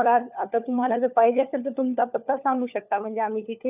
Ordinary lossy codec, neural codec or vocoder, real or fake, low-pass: none; codec, 16 kHz, 4 kbps, FunCodec, trained on LibriTTS, 50 frames a second; fake; 3.6 kHz